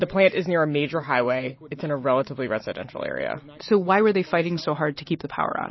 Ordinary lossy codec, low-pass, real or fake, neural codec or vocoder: MP3, 24 kbps; 7.2 kHz; real; none